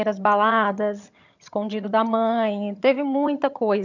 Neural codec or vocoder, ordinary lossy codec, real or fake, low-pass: vocoder, 22.05 kHz, 80 mel bands, HiFi-GAN; none; fake; 7.2 kHz